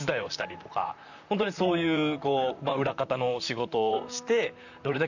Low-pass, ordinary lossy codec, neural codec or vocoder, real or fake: 7.2 kHz; none; vocoder, 44.1 kHz, 128 mel bands, Pupu-Vocoder; fake